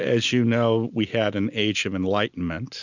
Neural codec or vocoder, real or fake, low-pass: none; real; 7.2 kHz